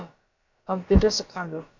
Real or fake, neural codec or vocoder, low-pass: fake; codec, 16 kHz, about 1 kbps, DyCAST, with the encoder's durations; 7.2 kHz